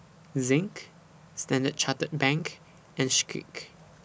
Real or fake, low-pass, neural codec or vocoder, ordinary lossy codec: real; none; none; none